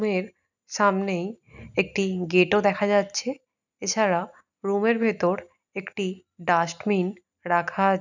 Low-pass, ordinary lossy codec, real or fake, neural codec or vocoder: 7.2 kHz; none; real; none